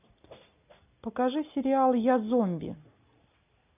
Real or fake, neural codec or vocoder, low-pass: real; none; 3.6 kHz